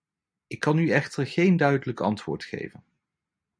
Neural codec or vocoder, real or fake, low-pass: none; real; 9.9 kHz